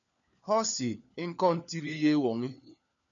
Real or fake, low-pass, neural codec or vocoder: fake; 7.2 kHz; codec, 16 kHz, 4 kbps, FunCodec, trained on LibriTTS, 50 frames a second